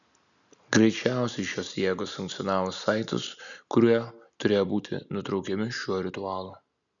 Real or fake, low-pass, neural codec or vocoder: real; 7.2 kHz; none